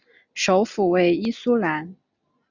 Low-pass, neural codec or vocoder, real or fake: 7.2 kHz; none; real